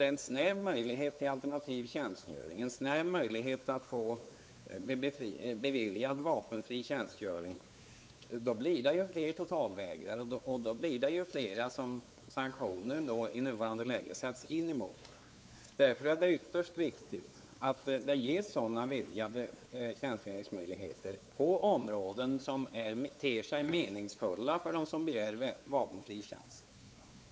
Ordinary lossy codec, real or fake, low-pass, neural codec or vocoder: none; fake; none; codec, 16 kHz, 4 kbps, X-Codec, WavLM features, trained on Multilingual LibriSpeech